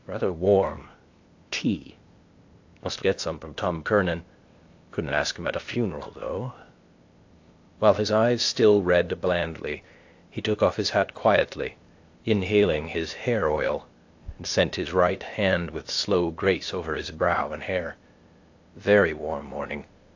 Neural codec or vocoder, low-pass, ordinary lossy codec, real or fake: codec, 16 kHz, 0.8 kbps, ZipCodec; 7.2 kHz; AAC, 48 kbps; fake